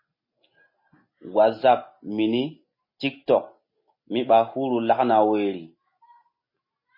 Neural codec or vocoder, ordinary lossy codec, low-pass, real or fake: none; MP3, 24 kbps; 5.4 kHz; real